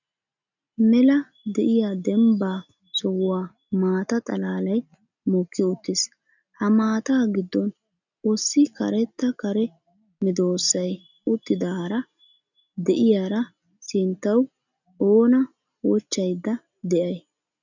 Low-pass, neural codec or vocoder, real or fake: 7.2 kHz; none; real